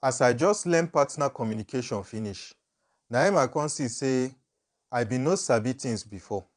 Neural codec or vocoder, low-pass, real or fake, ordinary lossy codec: vocoder, 22.05 kHz, 80 mel bands, Vocos; 9.9 kHz; fake; MP3, 96 kbps